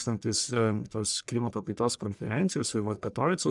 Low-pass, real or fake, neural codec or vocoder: 10.8 kHz; fake; codec, 44.1 kHz, 1.7 kbps, Pupu-Codec